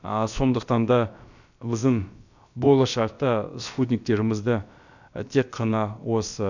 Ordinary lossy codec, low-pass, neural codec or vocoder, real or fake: none; 7.2 kHz; codec, 16 kHz, about 1 kbps, DyCAST, with the encoder's durations; fake